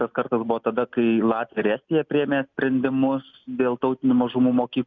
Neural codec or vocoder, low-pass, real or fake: none; 7.2 kHz; real